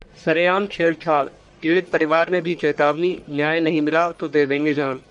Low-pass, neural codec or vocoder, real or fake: 10.8 kHz; codec, 44.1 kHz, 1.7 kbps, Pupu-Codec; fake